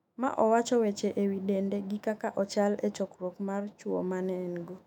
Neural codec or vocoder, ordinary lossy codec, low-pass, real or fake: autoencoder, 48 kHz, 128 numbers a frame, DAC-VAE, trained on Japanese speech; none; 19.8 kHz; fake